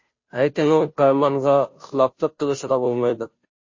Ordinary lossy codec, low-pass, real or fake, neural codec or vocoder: MP3, 32 kbps; 7.2 kHz; fake; codec, 16 kHz, 0.5 kbps, FunCodec, trained on Chinese and English, 25 frames a second